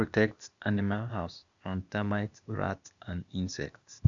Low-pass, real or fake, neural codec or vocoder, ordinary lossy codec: 7.2 kHz; fake; codec, 16 kHz, 0.8 kbps, ZipCodec; none